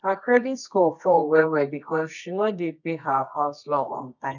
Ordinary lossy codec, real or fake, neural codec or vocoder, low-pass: none; fake; codec, 24 kHz, 0.9 kbps, WavTokenizer, medium music audio release; 7.2 kHz